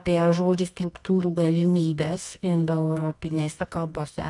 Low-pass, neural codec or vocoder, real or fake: 10.8 kHz; codec, 24 kHz, 0.9 kbps, WavTokenizer, medium music audio release; fake